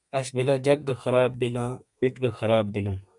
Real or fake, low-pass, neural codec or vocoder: fake; 10.8 kHz; codec, 32 kHz, 1.9 kbps, SNAC